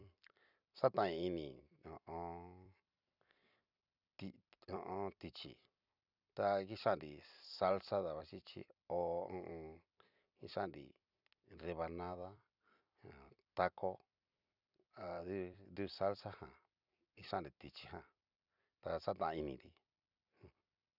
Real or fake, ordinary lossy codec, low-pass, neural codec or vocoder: fake; none; 5.4 kHz; vocoder, 44.1 kHz, 128 mel bands every 256 samples, BigVGAN v2